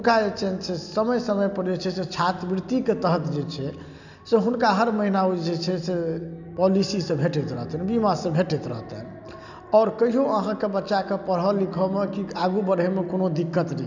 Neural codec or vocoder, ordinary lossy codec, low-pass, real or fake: none; none; 7.2 kHz; real